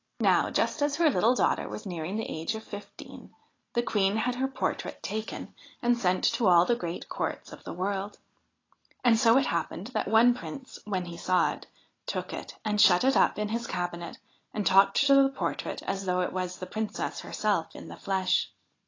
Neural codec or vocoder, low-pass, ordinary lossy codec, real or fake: none; 7.2 kHz; AAC, 32 kbps; real